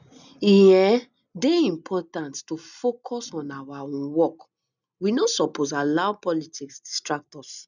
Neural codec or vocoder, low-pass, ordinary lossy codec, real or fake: none; 7.2 kHz; none; real